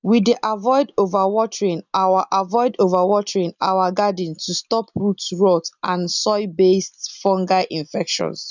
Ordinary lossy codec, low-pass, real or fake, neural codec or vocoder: none; 7.2 kHz; real; none